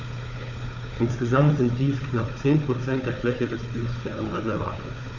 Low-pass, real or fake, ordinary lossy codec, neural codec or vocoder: 7.2 kHz; fake; none; codec, 16 kHz, 4 kbps, FunCodec, trained on Chinese and English, 50 frames a second